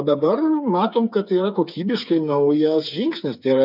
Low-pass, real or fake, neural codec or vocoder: 5.4 kHz; fake; codec, 16 kHz, 8 kbps, FreqCodec, smaller model